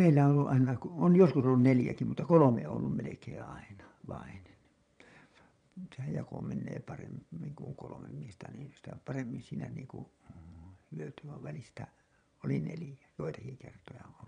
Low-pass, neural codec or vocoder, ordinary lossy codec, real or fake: 9.9 kHz; vocoder, 22.05 kHz, 80 mel bands, Vocos; none; fake